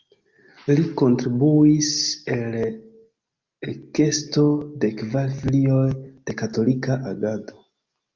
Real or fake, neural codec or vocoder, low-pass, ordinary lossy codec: real; none; 7.2 kHz; Opus, 32 kbps